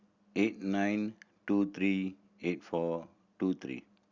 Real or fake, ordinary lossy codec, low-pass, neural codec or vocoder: real; Opus, 64 kbps; 7.2 kHz; none